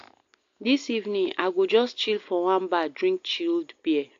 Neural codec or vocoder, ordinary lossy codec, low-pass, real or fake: none; AAC, 48 kbps; 7.2 kHz; real